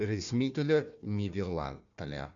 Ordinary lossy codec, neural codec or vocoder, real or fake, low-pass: none; codec, 16 kHz, 1 kbps, FunCodec, trained on Chinese and English, 50 frames a second; fake; 7.2 kHz